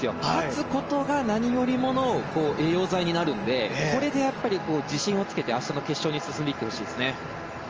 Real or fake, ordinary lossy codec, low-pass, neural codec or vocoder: real; Opus, 24 kbps; 7.2 kHz; none